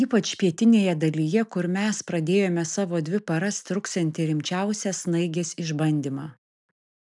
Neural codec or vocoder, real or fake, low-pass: none; real; 10.8 kHz